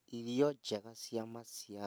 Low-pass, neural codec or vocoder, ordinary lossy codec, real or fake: none; none; none; real